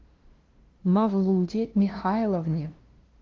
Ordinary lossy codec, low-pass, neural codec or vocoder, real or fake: Opus, 16 kbps; 7.2 kHz; codec, 16 kHz, 0.5 kbps, FunCodec, trained on LibriTTS, 25 frames a second; fake